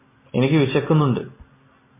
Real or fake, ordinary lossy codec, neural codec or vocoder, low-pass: real; MP3, 16 kbps; none; 3.6 kHz